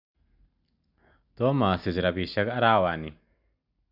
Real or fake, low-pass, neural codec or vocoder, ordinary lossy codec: real; 5.4 kHz; none; none